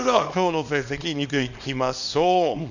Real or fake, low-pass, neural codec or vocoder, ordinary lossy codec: fake; 7.2 kHz; codec, 24 kHz, 0.9 kbps, WavTokenizer, small release; none